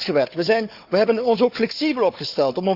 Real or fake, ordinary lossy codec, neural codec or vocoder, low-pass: fake; Opus, 64 kbps; codec, 16 kHz, 4 kbps, FunCodec, trained on Chinese and English, 50 frames a second; 5.4 kHz